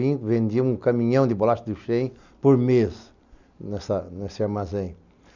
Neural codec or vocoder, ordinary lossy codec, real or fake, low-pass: none; none; real; 7.2 kHz